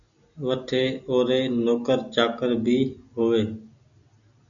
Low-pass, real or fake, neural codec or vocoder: 7.2 kHz; real; none